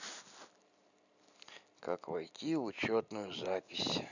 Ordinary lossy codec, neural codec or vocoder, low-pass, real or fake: none; none; 7.2 kHz; real